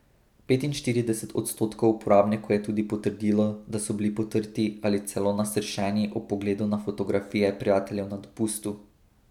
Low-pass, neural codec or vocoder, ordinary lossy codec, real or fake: 19.8 kHz; none; none; real